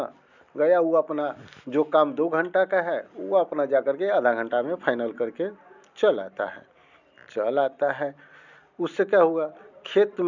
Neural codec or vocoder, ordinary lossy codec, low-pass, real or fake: none; none; 7.2 kHz; real